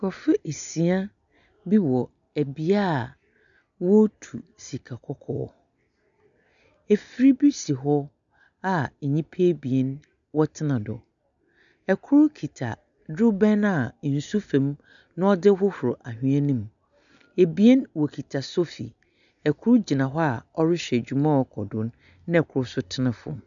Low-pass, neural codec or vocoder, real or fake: 7.2 kHz; none; real